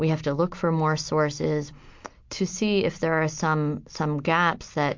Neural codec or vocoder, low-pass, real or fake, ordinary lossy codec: none; 7.2 kHz; real; MP3, 48 kbps